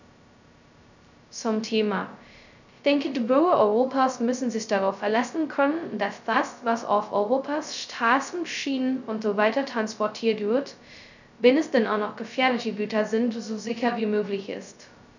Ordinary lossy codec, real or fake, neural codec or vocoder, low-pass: none; fake; codec, 16 kHz, 0.2 kbps, FocalCodec; 7.2 kHz